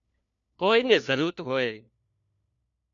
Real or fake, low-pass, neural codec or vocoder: fake; 7.2 kHz; codec, 16 kHz, 1 kbps, FunCodec, trained on LibriTTS, 50 frames a second